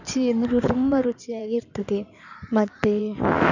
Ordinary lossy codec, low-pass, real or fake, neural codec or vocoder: none; 7.2 kHz; fake; codec, 16 kHz in and 24 kHz out, 2.2 kbps, FireRedTTS-2 codec